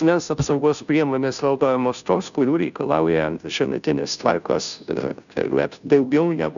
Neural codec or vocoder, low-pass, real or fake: codec, 16 kHz, 0.5 kbps, FunCodec, trained on Chinese and English, 25 frames a second; 7.2 kHz; fake